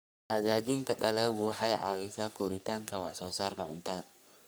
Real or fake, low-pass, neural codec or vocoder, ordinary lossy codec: fake; none; codec, 44.1 kHz, 3.4 kbps, Pupu-Codec; none